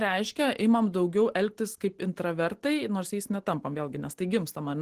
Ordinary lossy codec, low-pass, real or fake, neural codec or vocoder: Opus, 16 kbps; 14.4 kHz; real; none